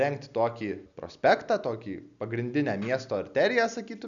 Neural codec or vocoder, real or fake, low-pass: none; real; 7.2 kHz